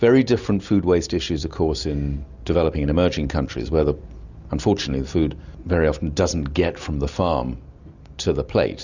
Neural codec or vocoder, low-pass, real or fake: none; 7.2 kHz; real